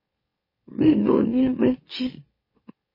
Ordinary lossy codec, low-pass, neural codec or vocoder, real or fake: MP3, 24 kbps; 5.4 kHz; autoencoder, 44.1 kHz, a latent of 192 numbers a frame, MeloTTS; fake